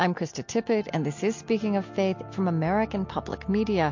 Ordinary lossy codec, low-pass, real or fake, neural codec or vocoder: MP3, 48 kbps; 7.2 kHz; real; none